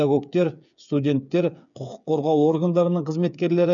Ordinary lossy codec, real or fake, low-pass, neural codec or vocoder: none; fake; 7.2 kHz; codec, 16 kHz, 8 kbps, FreqCodec, smaller model